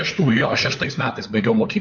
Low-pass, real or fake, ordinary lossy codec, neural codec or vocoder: 7.2 kHz; fake; MP3, 48 kbps; codec, 16 kHz, 4 kbps, FunCodec, trained on LibriTTS, 50 frames a second